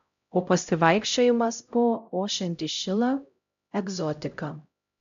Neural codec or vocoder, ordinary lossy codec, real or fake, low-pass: codec, 16 kHz, 0.5 kbps, X-Codec, HuBERT features, trained on LibriSpeech; AAC, 64 kbps; fake; 7.2 kHz